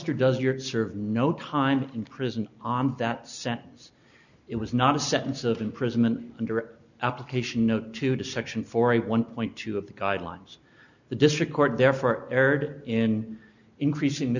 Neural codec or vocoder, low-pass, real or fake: none; 7.2 kHz; real